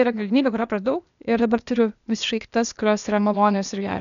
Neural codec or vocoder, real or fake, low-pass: codec, 16 kHz, 0.8 kbps, ZipCodec; fake; 7.2 kHz